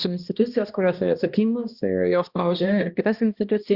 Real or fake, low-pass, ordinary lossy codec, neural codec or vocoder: fake; 5.4 kHz; Opus, 64 kbps; codec, 16 kHz, 1 kbps, X-Codec, HuBERT features, trained on balanced general audio